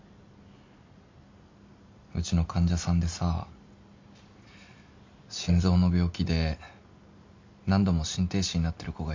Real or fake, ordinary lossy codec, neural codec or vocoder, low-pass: real; MP3, 48 kbps; none; 7.2 kHz